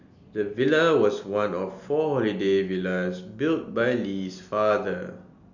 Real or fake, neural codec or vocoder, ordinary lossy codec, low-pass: real; none; none; 7.2 kHz